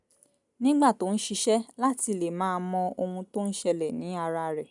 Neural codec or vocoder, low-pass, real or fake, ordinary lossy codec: none; 10.8 kHz; real; none